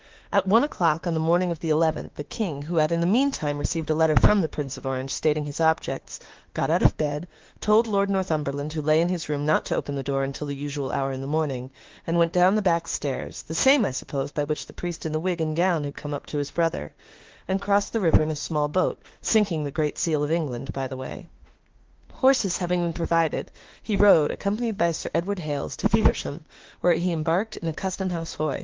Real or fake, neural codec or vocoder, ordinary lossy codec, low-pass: fake; autoencoder, 48 kHz, 32 numbers a frame, DAC-VAE, trained on Japanese speech; Opus, 16 kbps; 7.2 kHz